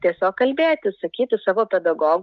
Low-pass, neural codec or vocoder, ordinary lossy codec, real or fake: 7.2 kHz; none; Opus, 32 kbps; real